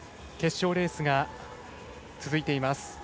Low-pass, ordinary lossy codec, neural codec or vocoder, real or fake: none; none; none; real